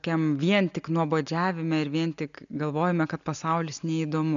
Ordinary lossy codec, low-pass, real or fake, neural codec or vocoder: MP3, 96 kbps; 7.2 kHz; real; none